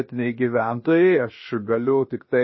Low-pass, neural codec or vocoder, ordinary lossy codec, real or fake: 7.2 kHz; codec, 16 kHz, 0.7 kbps, FocalCodec; MP3, 24 kbps; fake